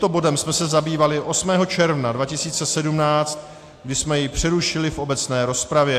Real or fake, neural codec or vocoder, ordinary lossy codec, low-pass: real; none; AAC, 64 kbps; 14.4 kHz